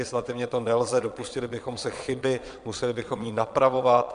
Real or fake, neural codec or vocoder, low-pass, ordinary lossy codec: fake; vocoder, 22.05 kHz, 80 mel bands, Vocos; 9.9 kHz; MP3, 64 kbps